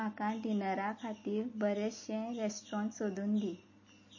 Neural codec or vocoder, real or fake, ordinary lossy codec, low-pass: none; real; MP3, 32 kbps; 7.2 kHz